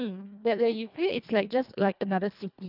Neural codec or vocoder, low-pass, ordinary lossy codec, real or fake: codec, 24 kHz, 1.5 kbps, HILCodec; 5.4 kHz; none; fake